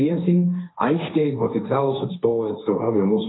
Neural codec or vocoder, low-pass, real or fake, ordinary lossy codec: codec, 16 kHz, 1.1 kbps, Voila-Tokenizer; 7.2 kHz; fake; AAC, 16 kbps